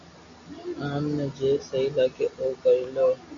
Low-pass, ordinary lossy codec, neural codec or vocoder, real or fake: 7.2 kHz; AAC, 48 kbps; none; real